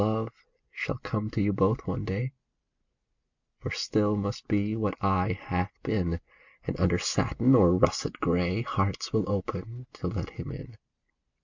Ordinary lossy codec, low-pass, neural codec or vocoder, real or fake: MP3, 64 kbps; 7.2 kHz; none; real